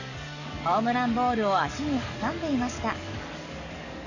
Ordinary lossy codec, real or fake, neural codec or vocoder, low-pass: none; fake; codec, 44.1 kHz, 7.8 kbps, DAC; 7.2 kHz